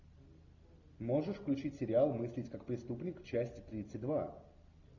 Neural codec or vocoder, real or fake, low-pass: none; real; 7.2 kHz